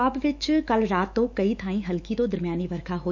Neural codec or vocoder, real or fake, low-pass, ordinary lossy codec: autoencoder, 48 kHz, 128 numbers a frame, DAC-VAE, trained on Japanese speech; fake; 7.2 kHz; none